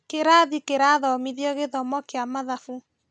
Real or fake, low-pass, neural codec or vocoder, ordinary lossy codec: real; none; none; none